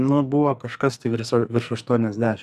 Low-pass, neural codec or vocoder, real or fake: 14.4 kHz; codec, 44.1 kHz, 2.6 kbps, SNAC; fake